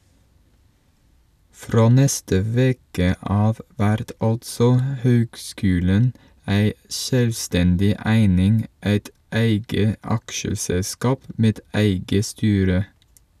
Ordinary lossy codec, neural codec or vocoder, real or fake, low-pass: none; none; real; 14.4 kHz